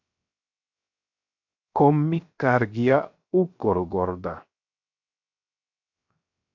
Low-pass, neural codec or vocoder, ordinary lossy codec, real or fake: 7.2 kHz; codec, 16 kHz, 0.7 kbps, FocalCodec; MP3, 48 kbps; fake